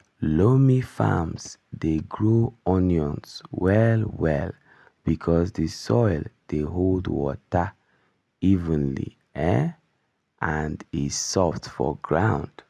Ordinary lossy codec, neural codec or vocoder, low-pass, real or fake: none; none; none; real